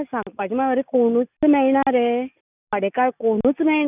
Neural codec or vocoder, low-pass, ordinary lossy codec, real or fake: none; 3.6 kHz; none; real